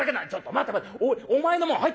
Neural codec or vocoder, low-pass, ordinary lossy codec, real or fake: none; none; none; real